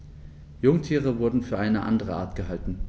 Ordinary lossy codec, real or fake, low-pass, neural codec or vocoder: none; real; none; none